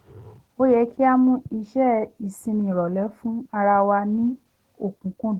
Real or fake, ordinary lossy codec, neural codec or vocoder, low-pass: real; Opus, 16 kbps; none; 19.8 kHz